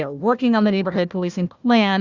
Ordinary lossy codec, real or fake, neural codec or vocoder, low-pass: Opus, 64 kbps; fake; codec, 16 kHz, 1 kbps, FunCodec, trained on Chinese and English, 50 frames a second; 7.2 kHz